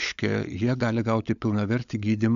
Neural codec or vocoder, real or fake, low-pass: codec, 16 kHz, 16 kbps, FunCodec, trained on LibriTTS, 50 frames a second; fake; 7.2 kHz